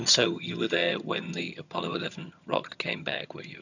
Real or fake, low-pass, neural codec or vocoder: fake; 7.2 kHz; vocoder, 22.05 kHz, 80 mel bands, HiFi-GAN